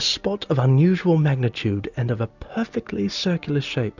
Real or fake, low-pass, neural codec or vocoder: real; 7.2 kHz; none